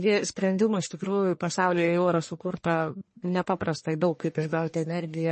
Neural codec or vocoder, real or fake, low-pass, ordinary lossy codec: codec, 44.1 kHz, 1.7 kbps, Pupu-Codec; fake; 10.8 kHz; MP3, 32 kbps